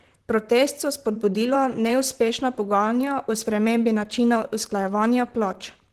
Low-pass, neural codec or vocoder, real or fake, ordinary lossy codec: 14.4 kHz; vocoder, 44.1 kHz, 128 mel bands, Pupu-Vocoder; fake; Opus, 16 kbps